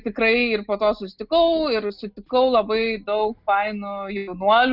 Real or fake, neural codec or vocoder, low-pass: real; none; 5.4 kHz